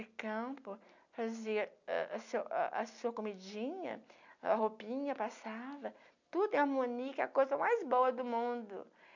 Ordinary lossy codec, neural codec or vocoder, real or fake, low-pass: none; none; real; 7.2 kHz